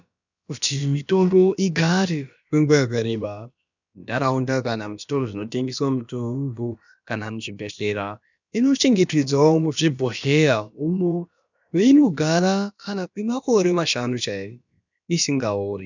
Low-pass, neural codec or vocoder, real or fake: 7.2 kHz; codec, 16 kHz, about 1 kbps, DyCAST, with the encoder's durations; fake